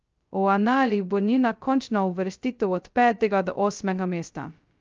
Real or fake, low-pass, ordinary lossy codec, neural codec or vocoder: fake; 7.2 kHz; Opus, 24 kbps; codec, 16 kHz, 0.2 kbps, FocalCodec